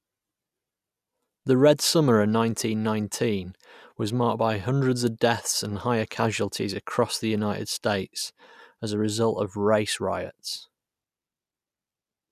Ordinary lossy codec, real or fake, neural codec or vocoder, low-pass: none; real; none; 14.4 kHz